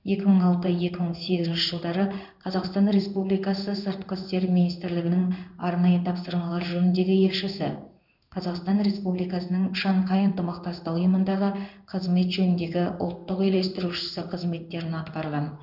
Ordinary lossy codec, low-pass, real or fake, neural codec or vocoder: none; 5.4 kHz; fake; codec, 16 kHz in and 24 kHz out, 1 kbps, XY-Tokenizer